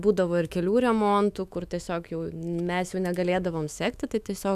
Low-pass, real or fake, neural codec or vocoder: 14.4 kHz; fake; autoencoder, 48 kHz, 128 numbers a frame, DAC-VAE, trained on Japanese speech